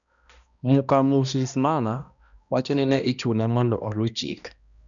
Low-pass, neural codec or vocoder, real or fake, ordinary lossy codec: 7.2 kHz; codec, 16 kHz, 1 kbps, X-Codec, HuBERT features, trained on balanced general audio; fake; none